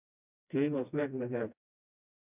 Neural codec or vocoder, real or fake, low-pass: codec, 16 kHz, 1 kbps, FreqCodec, smaller model; fake; 3.6 kHz